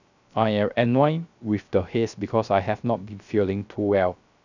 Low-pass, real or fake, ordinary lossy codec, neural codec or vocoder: 7.2 kHz; fake; none; codec, 16 kHz, 0.3 kbps, FocalCodec